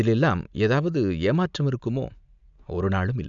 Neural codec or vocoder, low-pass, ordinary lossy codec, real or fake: none; 7.2 kHz; none; real